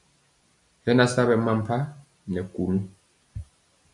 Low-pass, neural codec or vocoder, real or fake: 10.8 kHz; none; real